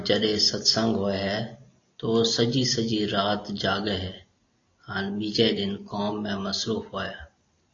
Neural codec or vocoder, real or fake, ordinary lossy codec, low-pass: none; real; AAC, 32 kbps; 7.2 kHz